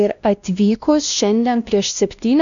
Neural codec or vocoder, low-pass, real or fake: codec, 16 kHz, 1 kbps, X-Codec, WavLM features, trained on Multilingual LibriSpeech; 7.2 kHz; fake